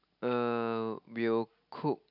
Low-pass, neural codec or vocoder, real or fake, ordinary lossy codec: 5.4 kHz; none; real; none